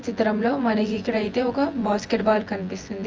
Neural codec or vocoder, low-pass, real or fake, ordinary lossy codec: vocoder, 24 kHz, 100 mel bands, Vocos; 7.2 kHz; fake; Opus, 24 kbps